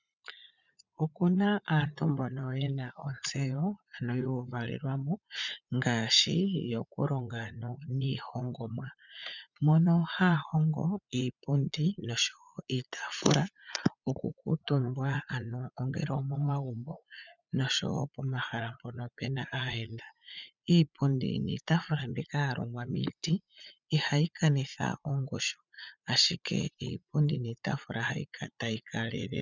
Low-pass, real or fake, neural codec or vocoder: 7.2 kHz; fake; vocoder, 44.1 kHz, 80 mel bands, Vocos